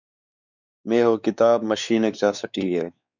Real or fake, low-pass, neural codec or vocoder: fake; 7.2 kHz; codec, 16 kHz, 4 kbps, X-Codec, WavLM features, trained on Multilingual LibriSpeech